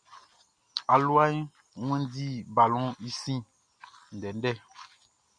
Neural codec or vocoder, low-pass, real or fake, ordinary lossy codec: none; 9.9 kHz; real; MP3, 96 kbps